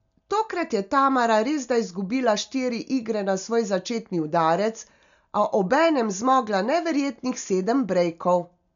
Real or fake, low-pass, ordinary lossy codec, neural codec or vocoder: real; 7.2 kHz; none; none